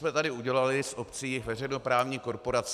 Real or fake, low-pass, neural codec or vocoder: real; 14.4 kHz; none